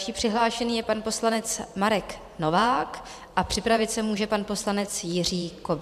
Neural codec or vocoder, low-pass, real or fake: vocoder, 44.1 kHz, 128 mel bands every 512 samples, BigVGAN v2; 14.4 kHz; fake